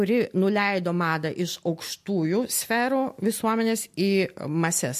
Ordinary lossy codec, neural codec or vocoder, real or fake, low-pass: MP3, 64 kbps; none; real; 14.4 kHz